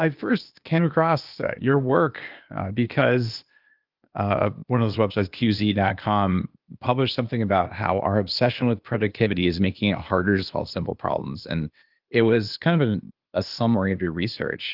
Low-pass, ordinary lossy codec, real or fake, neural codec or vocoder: 5.4 kHz; Opus, 24 kbps; fake; codec, 16 kHz, 0.8 kbps, ZipCodec